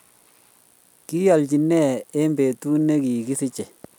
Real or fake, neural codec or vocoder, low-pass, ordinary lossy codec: real; none; 19.8 kHz; none